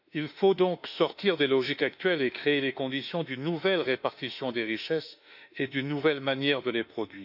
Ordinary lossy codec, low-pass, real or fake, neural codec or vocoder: none; 5.4 kHz; fake; autoencoder, 48 kHz, 32 numbers a frame, DAC-VAE, trained on Japanese speech